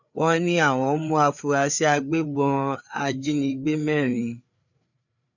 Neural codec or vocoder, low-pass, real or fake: codec, 16 kHz, 4 kbps, FreqCodec, larger model; 7.2 kHz; fake